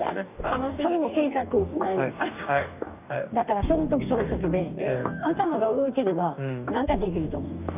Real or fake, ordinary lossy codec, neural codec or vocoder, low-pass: fake; none; codec, 44.1 kHz, 2.6 kbps, DAC; 3.6 kHz